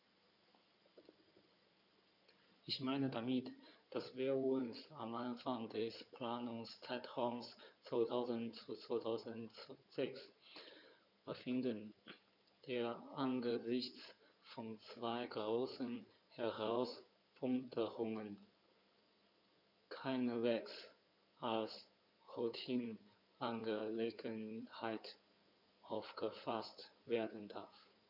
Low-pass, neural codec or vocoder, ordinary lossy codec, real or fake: 5.4 kHz; codec, 16 kHz in and 24 kHz out, 2.2 kbps, FireRedTTS-2 codec; none; fake